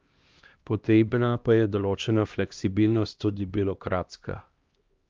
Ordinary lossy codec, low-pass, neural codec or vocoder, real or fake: Opus, 24 kbps; 7.2 kHz; codec, 16 kHz, 1 kbps, X-Codec, HuBERT features, trained on LibriSpeech; fake